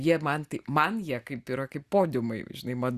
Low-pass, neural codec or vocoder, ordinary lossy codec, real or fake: 14.4 kHz; none; Opus, 64 kbps; real